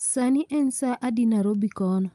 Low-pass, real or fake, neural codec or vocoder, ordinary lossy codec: 10.8 kHz; real; none; Opus, 32 kbps